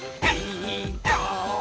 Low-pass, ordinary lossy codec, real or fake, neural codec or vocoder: none; none; real; none